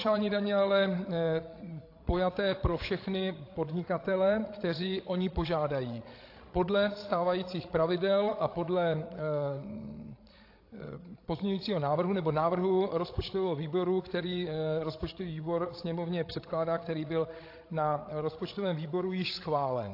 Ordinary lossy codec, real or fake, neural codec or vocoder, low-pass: AAC, 32 kbps; fake; codec, 16 kHz, 16 kbps, FreqCodec, larger model; 5.4 kHz